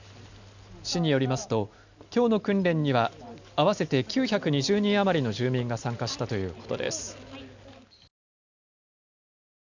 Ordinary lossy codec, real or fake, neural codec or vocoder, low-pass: none; real; none; 7.2 kHz